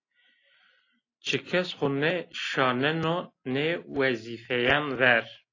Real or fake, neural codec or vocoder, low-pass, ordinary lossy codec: real; none; 7.2 kHz; AAC, 32 kbps